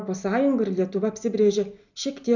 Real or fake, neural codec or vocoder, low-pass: real; none; 7.2 kHz